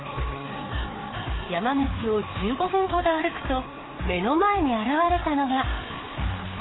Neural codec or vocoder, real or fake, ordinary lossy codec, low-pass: codec, 16 kHz, 4 kbps, FreqCodec, larger model; fake; AAC, 16 kbps; 7.2 kHz